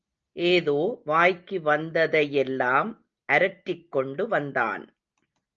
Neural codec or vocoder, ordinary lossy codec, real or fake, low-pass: none; Opus, 24 kbps; real; 7.2 kHz